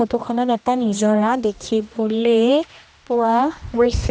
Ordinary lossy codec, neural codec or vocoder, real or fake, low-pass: none; codec, 16 kHz, 1 kbps, X-Codec, HuBERT features, trained on general audio; fake; none